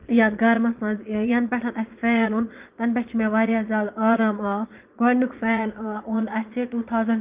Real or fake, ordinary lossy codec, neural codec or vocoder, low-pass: fake; Opus, 24 kbps; vocoder, 22.05 kHz, 80 mel bands, Vocos; 3.6 kHz